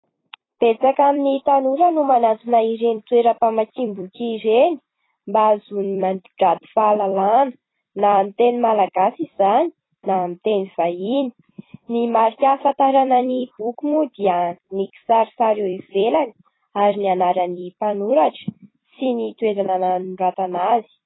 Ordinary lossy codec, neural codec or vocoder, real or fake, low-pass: AAC, 16 kbps; vocoder, 44.1 kHz, 128 mel bands every 256 samples, BigVGAN v2; fake; 7.2 kHz